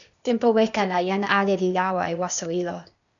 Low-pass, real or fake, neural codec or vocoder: 7.2 kHz; fake; codec, 16 kHz, 0.8 kbps, ZipCodec